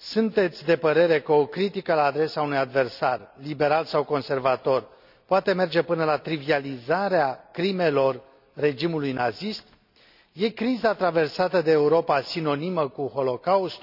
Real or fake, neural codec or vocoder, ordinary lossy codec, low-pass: real; none; none; 5.4 kHz